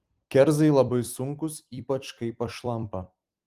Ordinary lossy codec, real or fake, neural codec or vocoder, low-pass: Opus, 24 kbps; fake; vocoder, 44.1 kHz, 128 mel bands every 256 samples, BigVGAN v2; 14.4 kHz